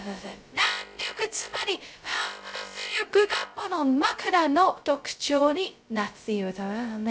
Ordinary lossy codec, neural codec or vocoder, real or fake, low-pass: none; codec, 16 kHz, 0.2 kbps, FocalCodec; fake; none